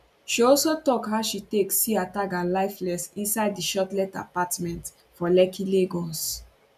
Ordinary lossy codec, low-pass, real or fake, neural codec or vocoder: none; 14.4 kHz; real; none